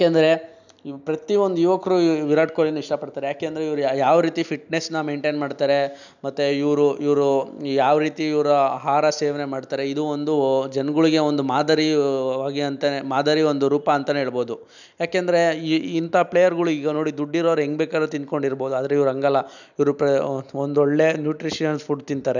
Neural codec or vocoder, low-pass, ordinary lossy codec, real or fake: none; 7.2 kHz; none; real